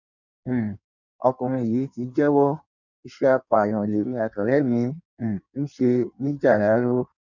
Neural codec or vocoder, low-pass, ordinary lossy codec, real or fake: codec, 16 kHz in and 24 kHz out, 1.1 kbps, FireRedTTS-2 codec; 7.2 kHz; none; fake